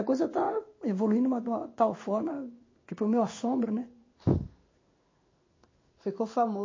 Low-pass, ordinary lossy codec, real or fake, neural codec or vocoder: 7.2 kHz; MP3, 32 kbps; fake; codec, 16 kHz in and 24 kHz out, 1 kbps, XY-Tokenizer